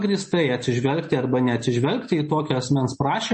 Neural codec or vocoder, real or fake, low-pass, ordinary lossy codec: none; real; 9.9 kHz; MP3, 32 kbps